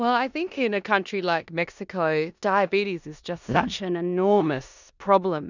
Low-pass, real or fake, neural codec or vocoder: 7.2 kHz; fake; codec, 16 kHz in and 24 kHz out, 0.9 kbps, LongCat-Audio-Codec, four codebook decoder